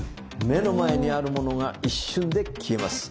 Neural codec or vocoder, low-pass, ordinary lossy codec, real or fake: none; none; none; real